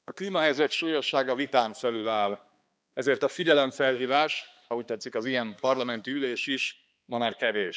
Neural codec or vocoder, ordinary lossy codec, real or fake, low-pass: codec, 16 kHz, 2 kbps, X-Codec, HuBERT features, trained on balanced general audio; none; fake; none